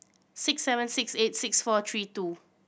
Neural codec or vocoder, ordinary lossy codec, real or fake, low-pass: none; none; real; none